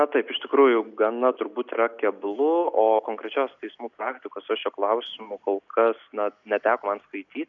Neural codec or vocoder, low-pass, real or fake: none; 7.2 kHz; real